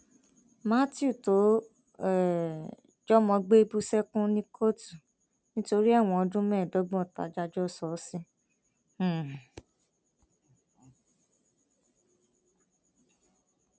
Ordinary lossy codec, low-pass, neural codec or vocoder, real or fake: none; none; none; real